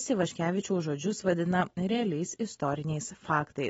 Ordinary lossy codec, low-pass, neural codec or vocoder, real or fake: AAC, 24 kbps; 10.8 kHz; none; real